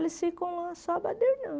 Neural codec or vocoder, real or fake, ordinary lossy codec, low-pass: none; real; none; none